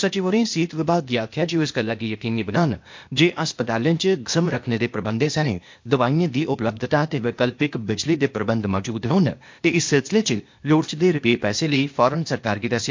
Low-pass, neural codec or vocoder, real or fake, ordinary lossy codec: 7.2 kHz; codec, 16 kHz, 0.8 kbps, ZipCodec; fake; MP3, 48 kbps